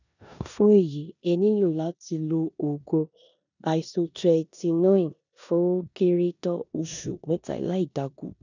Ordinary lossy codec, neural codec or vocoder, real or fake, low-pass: none; codec, 16 kHz in and 24 kHz out, 0.9 kbps, LongCat-Audio-Codec, four codebook decoder; fake; 7.2 kHz